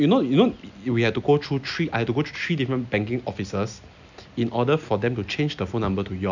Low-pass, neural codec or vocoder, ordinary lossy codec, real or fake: 7.2 kHz; none; none; real